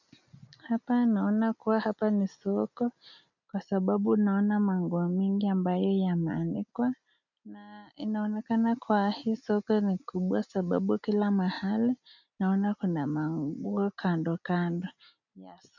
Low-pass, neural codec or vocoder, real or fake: 7.2 kHz; none; real